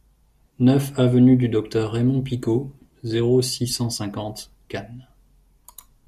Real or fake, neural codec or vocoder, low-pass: real; none; 14.4 kHz